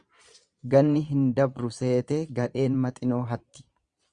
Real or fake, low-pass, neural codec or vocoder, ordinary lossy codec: fake; 9.9 kHz; vocoder, 22.05 kHz, 80 mel bands, Vocos; Opus, 64 kbps